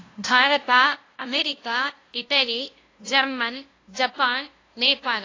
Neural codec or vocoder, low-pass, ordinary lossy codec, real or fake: codec, 16 kHz, 0.5 kbps, FunCodec, trained on LibriTTS, 25 frames a second; 7.2 kHz; AAC, 32 kbps; fake